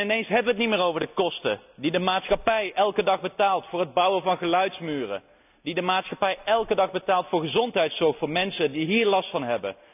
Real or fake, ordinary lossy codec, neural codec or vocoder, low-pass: real; none; none; 3.6 kHz